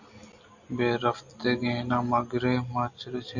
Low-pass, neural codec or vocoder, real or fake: 7.2 kHz; none; real